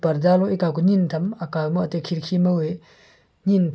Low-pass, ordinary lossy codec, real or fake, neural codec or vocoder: none; none; real; none